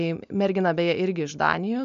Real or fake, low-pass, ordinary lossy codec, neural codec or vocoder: real; 7.2 kHz; AAC, 96 kbps; none